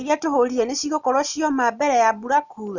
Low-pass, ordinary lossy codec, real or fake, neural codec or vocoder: 7.2 kHz; none; real; none